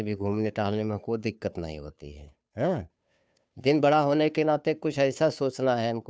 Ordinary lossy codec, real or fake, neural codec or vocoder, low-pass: none; fake; codec, 16 kHz, 2 kbps, FunCodec, trained on Chinese and English, 25 frames a second; none